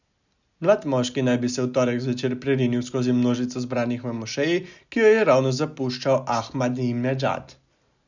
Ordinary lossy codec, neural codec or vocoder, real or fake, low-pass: none; none; real; 7.2 kHz